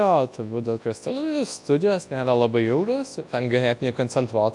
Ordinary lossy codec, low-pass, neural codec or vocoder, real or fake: AAC, 64 kbps; 10.8 kHz; codec, 24 kHz, 0.9 kbps, WavTokenizer, large speech release; fake